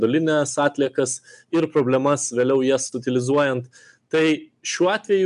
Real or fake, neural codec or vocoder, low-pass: real; none; 10.8 kHz